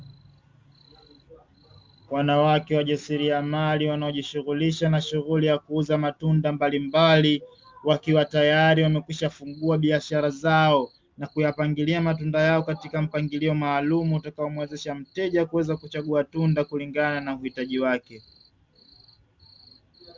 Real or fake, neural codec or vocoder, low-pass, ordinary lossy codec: real; none; 7.2 kHz; Opus, 32 kbps